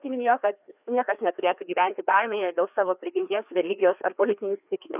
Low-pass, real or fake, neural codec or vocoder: 3.6 kHz; fake; codec, 16 kHz, 2 kbps, FreqCodec, larger model